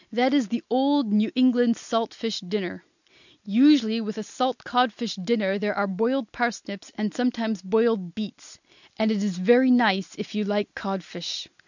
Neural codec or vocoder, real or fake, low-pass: none; real; 7.2 kHz